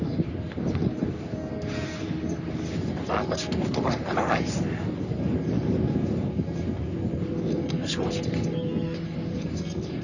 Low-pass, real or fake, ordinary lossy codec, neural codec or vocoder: 7.2 kHz; fake; none; codec, 44.1 kHz, 3.4 kbps, Pupu-Codec